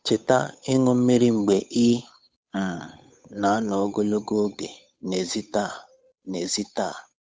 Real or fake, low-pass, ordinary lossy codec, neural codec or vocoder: fake; none; none; codec, 16 kHz, 8 kbps, FunCodec, trained on Chinese and English, 25 frames a second